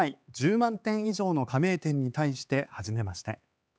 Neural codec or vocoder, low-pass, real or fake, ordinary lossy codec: codec, 16 kHz, 4 kbps, X-Codec, HuBERT features, trained on LibriSpeech; none; fake; none